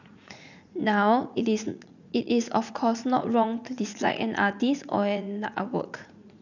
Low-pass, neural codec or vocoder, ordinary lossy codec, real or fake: 7.2 kHz; vocoder, 44.1 kHz, 80 mel bands, Vocos; none; fake